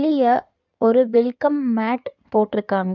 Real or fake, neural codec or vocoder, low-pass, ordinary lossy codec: fake; codec, 16 kHz in and 24 kHz out, 2.2 kbps, FireRedTTS-2 codec; 7.2 kHz; none